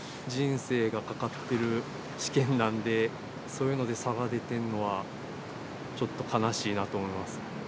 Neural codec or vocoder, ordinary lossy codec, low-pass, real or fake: none; none; none; real